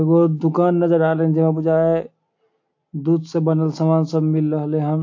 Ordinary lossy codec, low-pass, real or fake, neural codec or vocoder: AAC, 48 kbps; 7.2 kHz; real; none